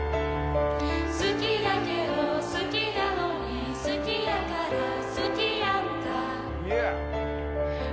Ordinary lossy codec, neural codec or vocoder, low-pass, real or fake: none; none; none; real